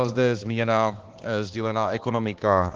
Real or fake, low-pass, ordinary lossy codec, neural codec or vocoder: fake; 7.2 kHz; Opus, 16 kbps; codec, 16 kHz, 2 kbps, X-Codec, HuBERT features, trained on balanced general audio